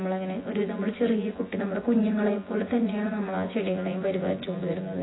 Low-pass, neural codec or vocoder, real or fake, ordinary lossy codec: 7.2 kHz; vocoder, 24 kHz, 100 mel bands, Vocos; fake; AAC, 16 kbps